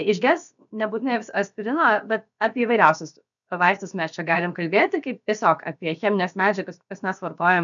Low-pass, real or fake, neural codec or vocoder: 7.2 kHz; fake; codec, 16 kHz, about 1 kbps, DyCAST, with the encoder's durations